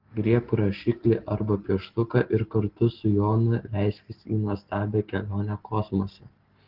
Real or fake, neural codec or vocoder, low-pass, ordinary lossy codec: real; none; 5.4 kHz; Opus, 16 kbps